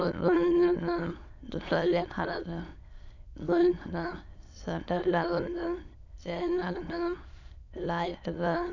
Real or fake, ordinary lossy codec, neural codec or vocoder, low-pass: fake; none; autoencoder, 22.05 kHz, a latent of 192 numbers a frame, VITS, trained on many speakers; 7.2 kHz